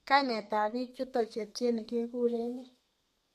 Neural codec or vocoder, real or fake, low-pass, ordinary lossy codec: codec, 32 kHz, 1.9 kbps, SNAC; fake; 14.4 kHz; MP3, 64 kbps